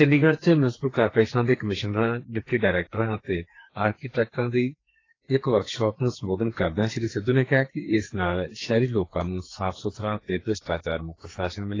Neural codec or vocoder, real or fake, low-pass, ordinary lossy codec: codec, 44.1 kHz, 2.6 kbps, SNAC; fake; 7.2 kHz; AAC, 32 kbps